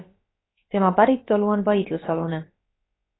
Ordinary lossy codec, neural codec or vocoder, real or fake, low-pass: AAC, 16 kbps; codec, 16 kHz, about 1 kbps, DyCAST, with the encoder's durations; fake; 7.2 kHz